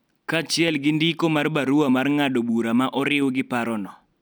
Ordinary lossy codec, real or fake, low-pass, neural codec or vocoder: none; real; none; none